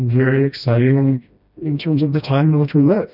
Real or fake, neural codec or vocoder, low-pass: fake; codec, 16 kHz, 1 kbps, FreqCodec, smaller model; 5.4 kHz